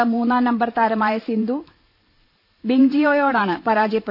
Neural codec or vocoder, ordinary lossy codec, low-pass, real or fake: vocoder, 44.1 kHz, 128 mel bands every 512 samples, BigVGAN v2; AAC, 48 kbps; 5.4 kHz; fake